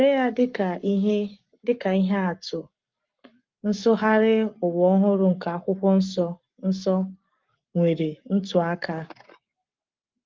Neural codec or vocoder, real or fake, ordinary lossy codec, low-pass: codec, 44.1 kHz, 7.8 kbps, Pupu-Codec; fake; Opus, 24 kbps; 7.2 kHz